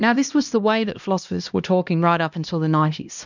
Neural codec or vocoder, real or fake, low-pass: codec, 16 kHz, 1 kbps, X-Codec, HuBERT features, trained on LibriSpeech; fake; 7.2 kHz